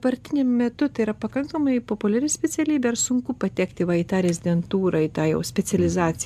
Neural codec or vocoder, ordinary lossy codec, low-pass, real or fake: none; MP3, 96 kbps; 14.4 kHz; real